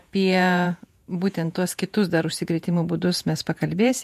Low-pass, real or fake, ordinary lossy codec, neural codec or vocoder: 14.4 kHz; fake; MP3, 64 kbps; vocoder, 48 kHz, 128 mel bands, Vocos